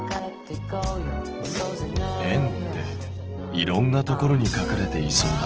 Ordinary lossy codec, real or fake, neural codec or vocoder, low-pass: Opus, 16 kbps; real; none; 7.2 kHz